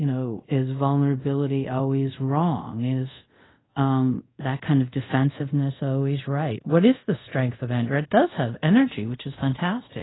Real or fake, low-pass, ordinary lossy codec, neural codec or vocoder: fake; 7.2 kHz; AAC, 16 kbps; codec, 24 kHz, 0.5 kbps, DualCodec